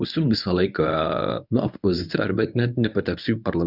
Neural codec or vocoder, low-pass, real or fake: codec, 24 kHz, 0.9 kbps, WavTokenizer, medium speech release version 1; 5.4 kHz; fake